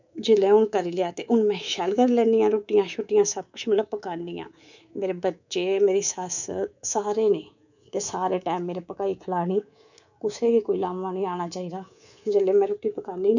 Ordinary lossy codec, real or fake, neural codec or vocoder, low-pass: none; fake; codec, 24 kHz, 3.1 kbps, DualCodec; 7.2 kHz